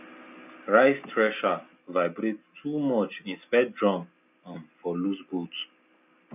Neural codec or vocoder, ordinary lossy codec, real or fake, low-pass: none; none; real; 3.6 kHz